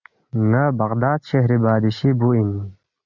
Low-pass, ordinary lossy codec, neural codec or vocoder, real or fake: 7.2 kHz; Opus, 64 kbps; none; real